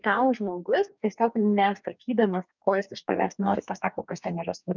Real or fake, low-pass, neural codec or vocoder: fake; 7.2 kHz; codec, 44.1 kHz, 2.6 kbps, DAC